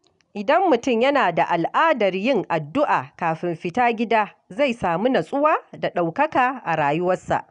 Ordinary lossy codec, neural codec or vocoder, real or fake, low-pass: none; none; real; 10.8 kHz